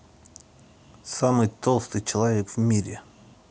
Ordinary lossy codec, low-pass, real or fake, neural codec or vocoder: none; none; real; none